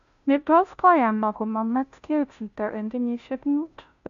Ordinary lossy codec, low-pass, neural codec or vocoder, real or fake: MP3, 96 kbps; 7.2 kHz; codec, 16 kHz, 0.5 kbps, FunCodec, trained on Chinese and English, 25 frames a second; fake